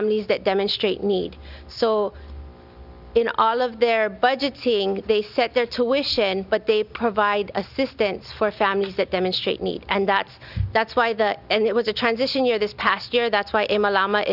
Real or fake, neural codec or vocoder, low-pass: real; none; 5.4 kHz